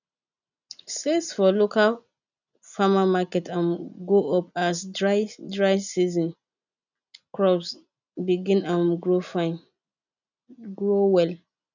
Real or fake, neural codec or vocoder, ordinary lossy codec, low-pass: real; none; none; 7.2 kHz